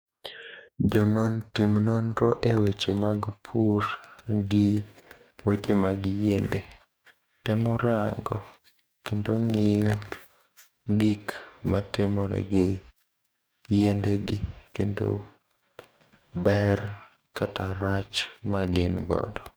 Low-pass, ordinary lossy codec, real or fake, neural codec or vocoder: none; none; fake; codec, 44.1 kHz, 2.6 kbps, DAC